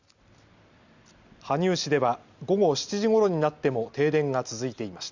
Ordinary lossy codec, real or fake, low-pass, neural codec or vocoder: Opus, 64 kbps; real; 7.2 kHz; none